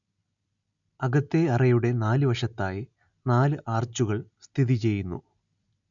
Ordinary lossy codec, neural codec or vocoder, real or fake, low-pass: none; none; real; 7.2 kHz